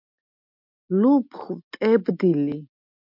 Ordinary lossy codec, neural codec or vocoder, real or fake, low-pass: MP3, 48 kbps; none; real; 5.4 kHz